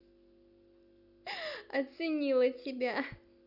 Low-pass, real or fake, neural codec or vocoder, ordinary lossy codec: 5.4 kHz; real; none; none